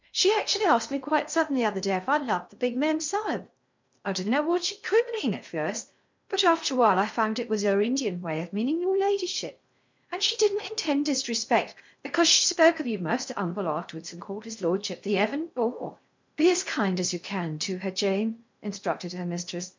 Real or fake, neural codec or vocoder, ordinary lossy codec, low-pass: fake; codec, 16 kHz in and 24 kHz out, 0.6 kbps, FocalCodec, streaming, 2048 codes; MP3, 64 kbps; 7.2 kHz